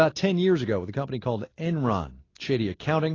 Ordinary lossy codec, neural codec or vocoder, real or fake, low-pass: AAC, 32 kbps; none; real; 7.2 kHz